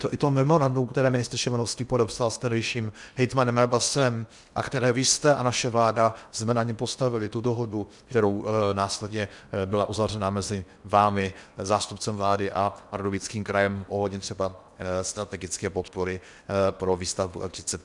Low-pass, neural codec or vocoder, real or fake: 10.8 kHz; codec, 16 kHz in and 24 kHz out, 0.8 kbps, FocalCodec, streaming, 65536 codes; fake